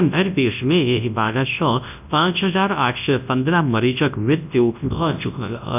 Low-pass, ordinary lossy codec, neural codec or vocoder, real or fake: 3.6 kHz; none; codec, 24 kHz, 0.9 kbps, WavTokenizer, large speech release; fake